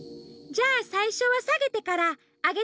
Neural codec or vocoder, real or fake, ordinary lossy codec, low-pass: none; real; none; none